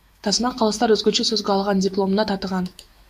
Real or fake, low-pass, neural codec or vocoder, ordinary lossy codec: fake; 14.4 kHz; autoencoder, 48 kHz, 128 numbers a frame, DAC-VAE, trained on Japanese speech; AAC, 96 kbps